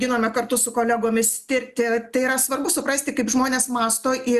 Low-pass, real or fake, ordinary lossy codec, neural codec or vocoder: 14.4 kHz; real; Opus, 64 kbps; none